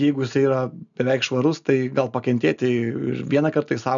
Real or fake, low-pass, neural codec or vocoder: real; 7.2 kHz; none